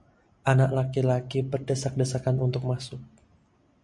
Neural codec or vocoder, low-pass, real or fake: none; 10.8 kHz; real